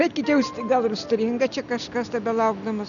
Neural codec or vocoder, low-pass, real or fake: none; 7.2 kHz; real